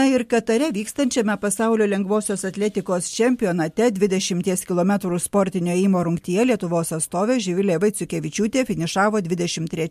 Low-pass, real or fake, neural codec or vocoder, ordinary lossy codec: 14.4 kHz; real; none; MP3, 64 kbps